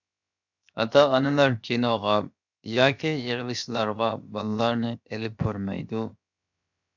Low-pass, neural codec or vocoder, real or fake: 7.2 kHz; codec, 16 kHz, 0.7 kbps, FocalCodec; fake